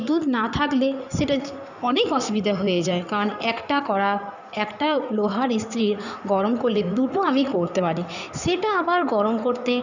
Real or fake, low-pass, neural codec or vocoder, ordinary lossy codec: fake; 7.2 kHz; codec, 16 kHz in and 24 kHz out, 2.2 kbps, FireRedTTS-2 codec; none